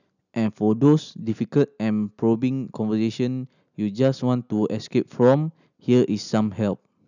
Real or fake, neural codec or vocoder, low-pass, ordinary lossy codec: real; none; 7.2 kHz; none